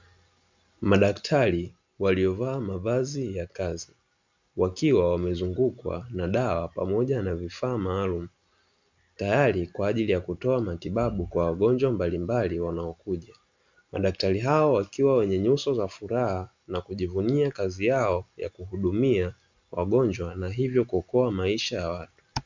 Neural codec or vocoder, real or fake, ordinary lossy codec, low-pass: none; real; MP3, 64 kbps; 7.2 kHz